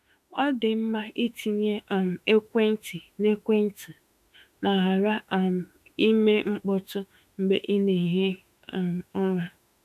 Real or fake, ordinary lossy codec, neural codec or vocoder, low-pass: fake; none; autoencoder, 48 kHz, 32 numbers a frame, DAC-VAE, trained on Japanese speech; 14.4 kHz